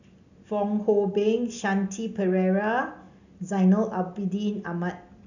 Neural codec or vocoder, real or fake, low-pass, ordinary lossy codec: none; real; 7.2 kHz; none